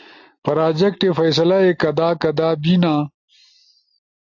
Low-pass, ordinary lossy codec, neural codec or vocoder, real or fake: 7.2 kHz; AAC, 48 kbps; none; real